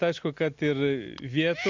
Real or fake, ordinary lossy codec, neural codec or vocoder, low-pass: real; MP3, 64 kbps; none; 7.2 kHz